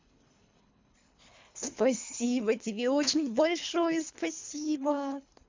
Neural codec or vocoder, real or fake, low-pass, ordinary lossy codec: codec, 24 kHz, 3 kbps, HILCodec; fake; 7.2 kHz; MP3, 64 kbps